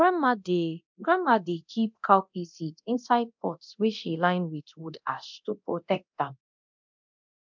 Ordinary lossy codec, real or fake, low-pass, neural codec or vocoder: MP3, 64 kbps; fake; 7.2 kHz; codec, 24 kHz, 0.9 kbps, DualCodec